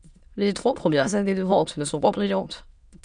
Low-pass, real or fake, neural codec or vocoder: 9.9 kHz; fake; autoencoder, 22.05 kHz, a latent of 192 numbers a frame, VITS, trained on many speakers